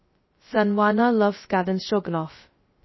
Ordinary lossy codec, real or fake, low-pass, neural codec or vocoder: MP3, 24 kbps; fake; 7.2 kHz; codec, 16 kHz, 0.2 kbps, FocalCodec